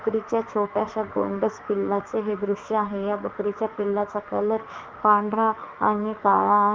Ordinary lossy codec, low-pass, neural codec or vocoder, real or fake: Opus, 32 kbps; 7.2 kHz; autoencoder, 48 kHz, 32 numbers a frame, DAC-VAE, trained on Japanese speech; fake